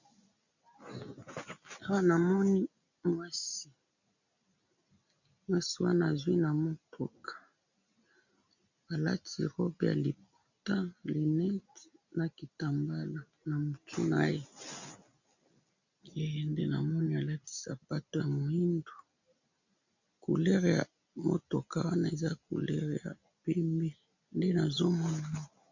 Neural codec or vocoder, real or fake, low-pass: none; real; 7.2 kHz